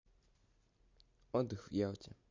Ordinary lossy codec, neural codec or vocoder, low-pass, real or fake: MP3, 48 kbps; none; 7.2 kHz; real